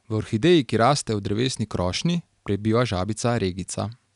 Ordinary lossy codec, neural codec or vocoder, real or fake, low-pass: none; none; real; 10.8 kHz